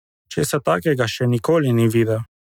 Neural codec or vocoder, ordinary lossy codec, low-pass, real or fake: none; none; 19.8 kHz; real